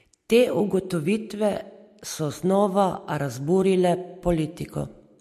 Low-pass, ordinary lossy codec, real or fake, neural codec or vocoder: 14.4 kHz; MP3, 64 kbps; real; none